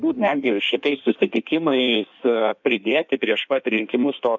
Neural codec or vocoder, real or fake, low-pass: codec, 16 kHz in and 24 kHz out, 1.1 kbps, FireRedTTS-2 codec; fake; 7.2 kHz